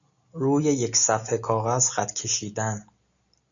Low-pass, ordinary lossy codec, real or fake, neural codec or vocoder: 7.2 kHz; MP3, 96 kbps; real; none